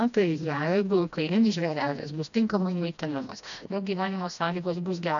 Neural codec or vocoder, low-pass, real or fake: codec, 16 kHz, 1 kbps, FreqCodec, smaller model; 7.2 kHz; fake